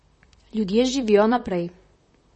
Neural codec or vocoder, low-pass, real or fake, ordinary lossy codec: vocoder, 44.1 kHz, 128 mel bands, Pupu-Vocoder; 10.8 kHz; fake; MP3, 32 kbps